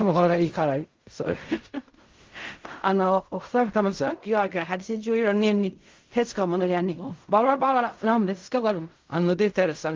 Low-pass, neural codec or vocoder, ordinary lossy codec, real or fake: 7.2 kHz; codec, 16 kHz in and 24 kHz out, 0.4 kbps, LongCat-Audio-Codec, fine tuned four codebook decoder; Opus, 32 kbps; fake